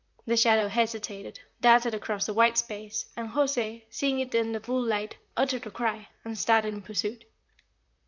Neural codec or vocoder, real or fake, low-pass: vocoder, 44.1 kHz, 128 mel bands, Pupu-Vocoder; fake; 7.2 kHz